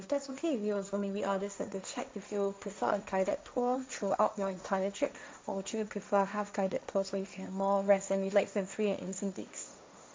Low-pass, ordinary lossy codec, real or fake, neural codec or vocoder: none; none; fake; codec, 16 kHz, 1.1 kbps, Voila-Tokenizer